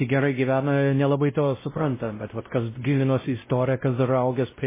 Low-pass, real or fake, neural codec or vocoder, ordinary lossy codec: 3.6 kHz; fake; codec, 16 kHz, 0.5 kbps, X-Codec, WavLM features, trained on Multilingual LibriSpeech; MP3, 16 kbps